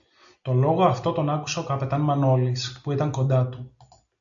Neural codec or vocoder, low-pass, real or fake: none; 7.2 kHz; real